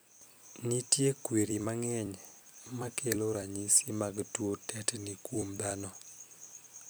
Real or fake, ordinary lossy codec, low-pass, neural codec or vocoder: fake; none; none; vocoder, 44.1 kHz, 128 mel bands every 256 samples, BigVGAN v2